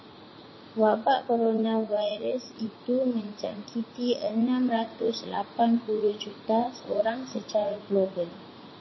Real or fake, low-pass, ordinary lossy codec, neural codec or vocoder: fake; 7.2 kHz; MP3, 24 kbps; vocoder, 44.1 kHz, 80 mel bands, Vocos